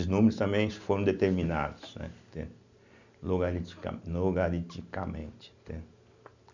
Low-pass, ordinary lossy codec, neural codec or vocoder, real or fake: 7.2 kHz; none; none; real